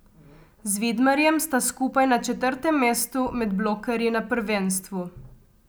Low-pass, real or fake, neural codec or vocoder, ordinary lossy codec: none; real; none; none